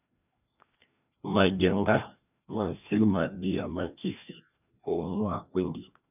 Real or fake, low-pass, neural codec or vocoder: fake; 3.6 kHz; codec, 16 kHz, 1 kbps, FreqCodec, larger model